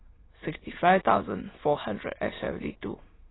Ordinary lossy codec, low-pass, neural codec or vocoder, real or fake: AAC, 16 kbps; 7.2 kHz; autoencoder, 22.05 kHz, a latent of 192 numbers a frame, VITS, trained on many speakers; fake